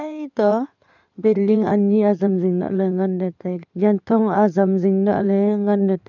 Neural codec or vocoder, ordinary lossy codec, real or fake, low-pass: codec, 16 kHz in and 24 kHz out, 2.2 kbps, FireRedTTS-2 codec; none; fake; 7.2 kHz